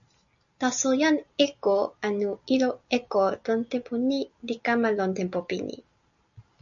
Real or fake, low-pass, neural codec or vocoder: real; 7.2 kHz; none